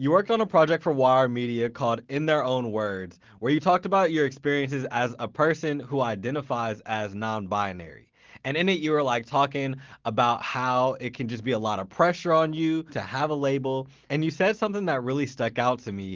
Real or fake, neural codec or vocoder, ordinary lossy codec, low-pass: real; none; Opus, 16 kbps; 7.2 kHz